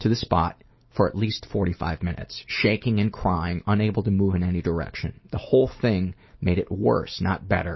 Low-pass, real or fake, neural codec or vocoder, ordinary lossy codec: 7.2 kHz; real; none; MP3, 24 kbps